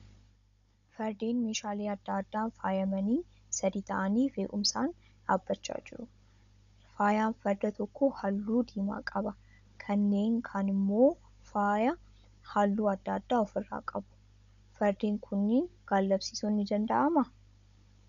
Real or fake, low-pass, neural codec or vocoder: fake; 7.2 kHz; codec, 16 kHz, 16 kbps, FunCodec, trained on Chinese and English, 50 frames a second